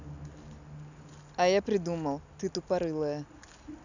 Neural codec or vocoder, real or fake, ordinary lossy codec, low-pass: none; real; none; 7.2 kHz